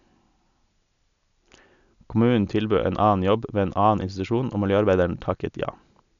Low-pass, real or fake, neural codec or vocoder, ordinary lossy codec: 7.2 kHz; real; none; MP3, 96 kbps